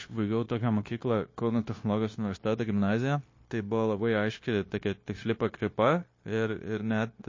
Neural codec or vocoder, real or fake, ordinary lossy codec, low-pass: codec, 16 kHz, 0.9 kbps, LongCat-Audio-Codec; fake; MP3, 32 kbps; 7.2 kHz